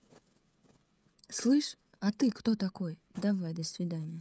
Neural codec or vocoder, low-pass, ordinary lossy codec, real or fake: codec, 16 kHz, 16 kbps, FreqCodec, smaller model; none; none; fake